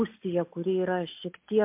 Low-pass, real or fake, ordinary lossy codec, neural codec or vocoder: 3.6 kHz; real; MP3, 32 kbps; none